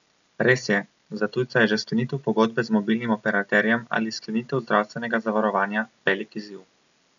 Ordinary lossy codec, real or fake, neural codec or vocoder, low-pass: none; real; none; 7.2 kHz